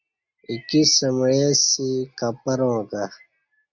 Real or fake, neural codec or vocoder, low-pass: real; none; 7.2 kHz